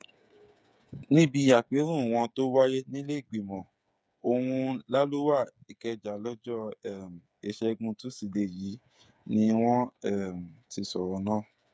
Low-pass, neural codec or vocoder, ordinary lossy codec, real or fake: none; codec, 16 kHz, 8 kbps, FreqCodec, smaller model; none; fake